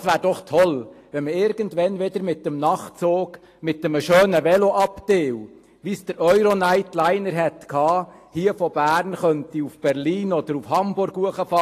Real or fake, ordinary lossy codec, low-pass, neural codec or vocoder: real; AAC, 48 kbps; 14.4 kHz; none